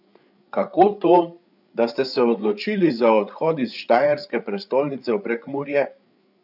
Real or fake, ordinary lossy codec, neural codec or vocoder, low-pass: fake; none; codec, 16 kHz, 8 kbps, FreqCodec, larger model; 5.4 kHz